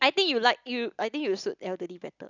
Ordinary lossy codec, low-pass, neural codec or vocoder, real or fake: none; 7.2 kHz; none; real